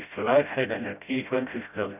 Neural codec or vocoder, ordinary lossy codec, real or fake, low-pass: codec, 16 kHz, 0.5 kbps, FreqCodec, smaller model; none; fake; 3.6 kHz